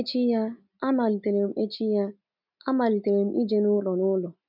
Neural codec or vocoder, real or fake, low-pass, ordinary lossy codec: none; real; 5.4 kHz; none